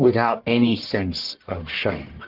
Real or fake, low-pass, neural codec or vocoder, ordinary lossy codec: fake; 5.4 kHz; codec, 44.1 kHz, 1.7 kbps, Pupu-Codec; Opus, 16 kbps